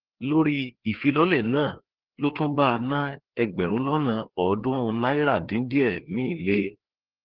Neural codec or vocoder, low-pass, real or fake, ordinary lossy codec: codec, 16 kHz, 2 kbps, FreqCodec, larger model; 5.4 kHz; fake; Opus, 16 kbps